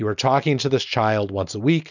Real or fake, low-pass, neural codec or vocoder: real; 7.2 kHz; none